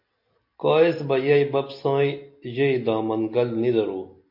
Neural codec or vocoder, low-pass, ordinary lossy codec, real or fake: none; 5.4 kHz; MP3, 32 kbps; real